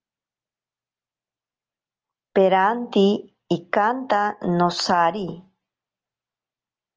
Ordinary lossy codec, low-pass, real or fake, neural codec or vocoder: Opus, 24 kbps; 7.2 kHz; real; none